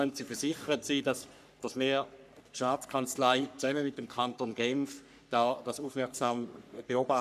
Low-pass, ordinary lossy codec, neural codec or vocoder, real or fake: 14.4 kHz; none; codec, 44.1 kHz, 3.4 kbps, Pupu-Codec; fake